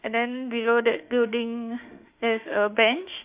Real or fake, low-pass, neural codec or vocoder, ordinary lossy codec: fake; 3.6 kHz; autoencoder, 48 kHz, 32 numbers a frame, DAC-VAE, trained on Japanese speech; Opus, 64 kbps